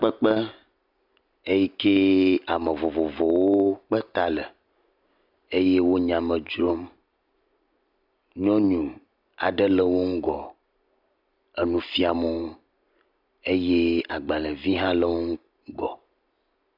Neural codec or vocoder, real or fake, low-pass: none; real; 5.4 kHz